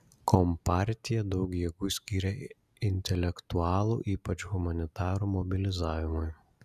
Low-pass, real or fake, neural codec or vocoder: 14.4 kHz; real; none